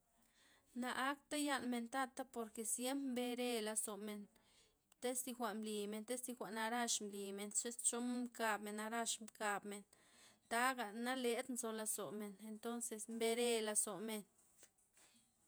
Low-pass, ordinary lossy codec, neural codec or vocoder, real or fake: none; none; vocoder, 48 kHz, 128 mel bands, Vocos; fake